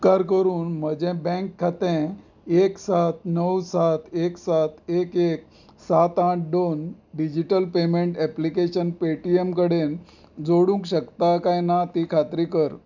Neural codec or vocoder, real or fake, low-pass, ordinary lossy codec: none; real; 7.2 kHz; none